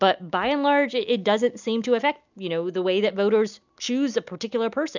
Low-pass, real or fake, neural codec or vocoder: 7.2 kHz; real; none